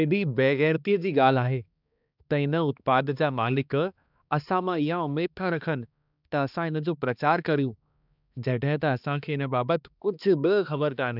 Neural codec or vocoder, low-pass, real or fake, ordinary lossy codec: codec, 16 kHz, 2 kbps, X-Codec, HuBERT features, trained on balanced general audio; 5.4 kHz; fake; none